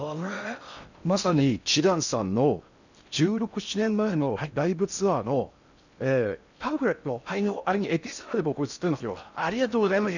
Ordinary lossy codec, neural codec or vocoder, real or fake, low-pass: none; codec, 16 kHz in and 24 kHz out, 0.6 kbps, FocalCodec, streaming, 4096 codes; fake; 7.2 kHz